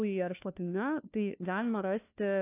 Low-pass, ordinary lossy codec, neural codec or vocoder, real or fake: 3.6 kHz; AAC, 24 kbps; codec, 16 kHz, 1 kbps, FunCodec, trained on LibriTTS, 50 frames a second; fake